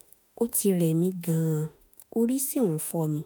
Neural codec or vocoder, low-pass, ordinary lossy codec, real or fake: autoencoder, 48 kHz, 32 numbers a frame, DAC-VAE, trained on Japanese speech; none; none; fake